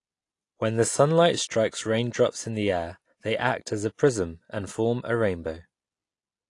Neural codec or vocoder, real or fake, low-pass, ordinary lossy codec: none; real; 10.8 kHz; AAC, 48 kbps